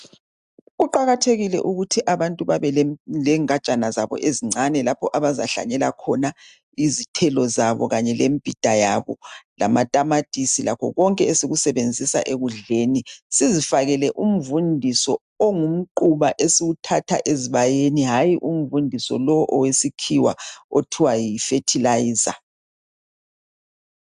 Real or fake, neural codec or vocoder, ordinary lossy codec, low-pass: real; none; AAC, 96 kbps; 10.8 kHz